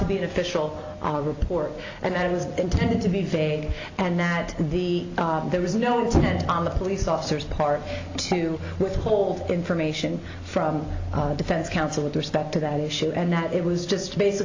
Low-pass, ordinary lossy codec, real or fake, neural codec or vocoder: 7.2 kHz; AAC, 48 kbps; real; none